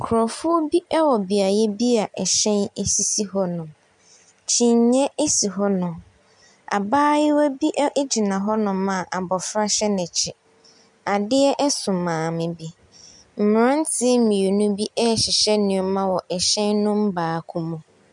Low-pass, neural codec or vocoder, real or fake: 10.8 kHz; none; real